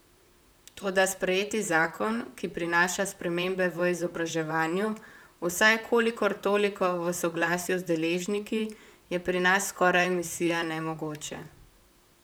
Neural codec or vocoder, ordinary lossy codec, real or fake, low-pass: vocoder, 44.1 kHz, 128 mel bands, Pupu-Vocoder; none; fake; none